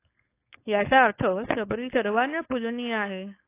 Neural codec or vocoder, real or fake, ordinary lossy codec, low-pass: codec, 16 kHz, 4.8 kbps, FACodec; fake; AAC, 24 kbps; 3.6 kHz